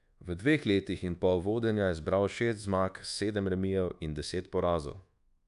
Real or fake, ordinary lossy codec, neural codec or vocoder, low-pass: fake; none; codec, 24 kHz, 1.2 kbps, DualCodec; 10.8 kHz